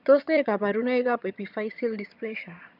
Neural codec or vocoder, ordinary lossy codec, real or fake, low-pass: vocoder, 22.05 kHz, 80 mel bands, HiFi-GAN; none; fake; 5.4 kHz